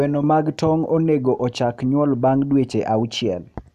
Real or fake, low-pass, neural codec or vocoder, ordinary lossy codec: real; 14.4 kHz; none; none